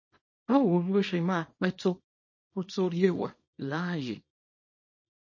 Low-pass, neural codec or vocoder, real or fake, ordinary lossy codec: 7.2 kHz; codec, 24 kHz, 0.9 kbps, WavTokenizer, small release; fake; MP3, 32 kbps